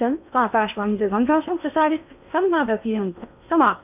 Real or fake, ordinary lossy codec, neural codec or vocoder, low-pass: fake; none; codec, 16 kHz in and 24 kHz out, 0.8 kbps, FocalCodec, streaming, 65536 codes; 3.6 kHz